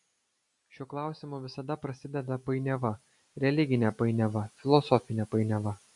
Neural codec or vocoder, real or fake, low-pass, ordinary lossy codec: none; real; 10.8 kHz; MP3, 64 kbps